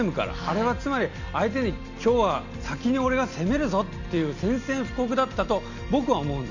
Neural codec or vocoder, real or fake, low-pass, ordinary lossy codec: none; real; 7.2 kHz; none